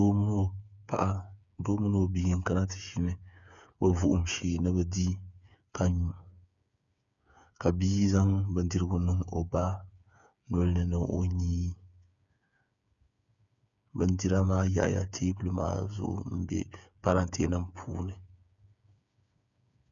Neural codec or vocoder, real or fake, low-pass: codec, 16 kHz, 8 kbps, FreqCodec, smaller model; fake; 7.2 kHz